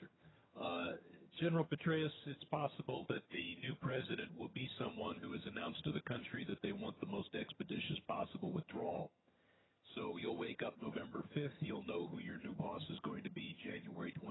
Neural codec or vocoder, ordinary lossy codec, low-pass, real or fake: vocoder, 22.05 kHz, 80 mel bands, HiFi-GAN; AAC, 16 kbps; 7.2 kHz; fake